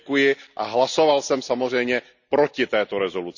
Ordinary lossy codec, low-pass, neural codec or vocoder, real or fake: none; 7.2 kHz; none; real